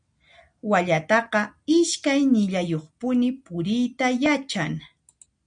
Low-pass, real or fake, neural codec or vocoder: 9.9 kHz; real; none